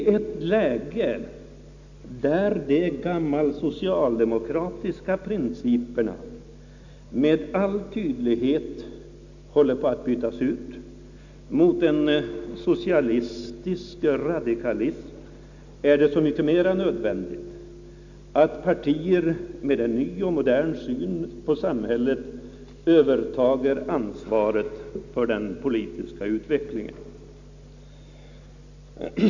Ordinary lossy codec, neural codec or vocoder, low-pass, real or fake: none; none; 7.2 kHz; real